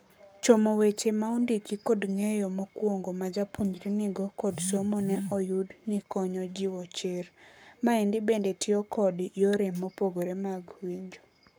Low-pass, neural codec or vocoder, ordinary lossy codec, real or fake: none; codec, 44.1 kHz, 7.8 kbps, Pupu-Codec; none; fake